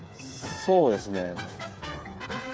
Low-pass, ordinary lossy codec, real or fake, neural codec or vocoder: none; none; fake; codec, 16 kHz, 8 kbps, FreqCodec, smaller model